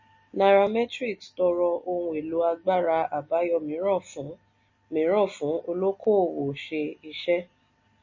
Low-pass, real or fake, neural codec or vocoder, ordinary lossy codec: 7.2 kHz; real; none; MP3, 32 kbps